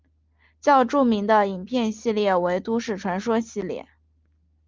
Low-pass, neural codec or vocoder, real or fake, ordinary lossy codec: 7.2 kHz; none; real; Opus, 24 kbps